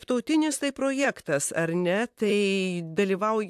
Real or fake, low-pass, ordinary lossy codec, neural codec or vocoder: fake; 14.4 kHz; AAC, 96 kbps; vocoder, 44.1 kHz, 128 mel bands, Pupu-Vocoder